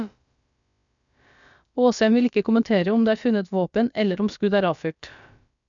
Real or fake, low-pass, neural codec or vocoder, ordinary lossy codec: fake; 7.2 kHz; codec, 16 kHz, about 1 kbps, DyCAST, with the encoder's durations; none